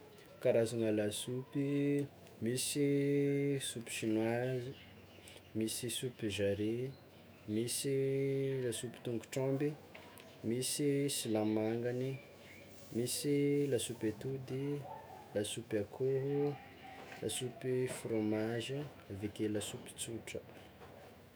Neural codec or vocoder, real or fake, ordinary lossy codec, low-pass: autoencoder, 48 kHz, 128 numbers a frame, DAC-VAE, trained on Japanese speech; fake; none; none